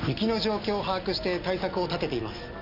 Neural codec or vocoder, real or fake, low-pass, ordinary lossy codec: none; real; 5.4 kHz; none